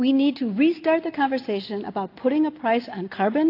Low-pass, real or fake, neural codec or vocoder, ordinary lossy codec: 5.4 kHz; real; none; AAC, 32 kbps